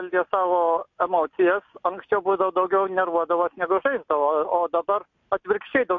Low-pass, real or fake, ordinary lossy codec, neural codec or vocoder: 7.2 kHz; real; MP3, 48 kbps; none